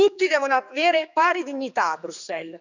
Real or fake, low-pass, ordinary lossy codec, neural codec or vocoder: fake; 7.2 kHz; none; codec, 16 kHz, 4 kbps, X-Codec, HuBERT features, trained on general audio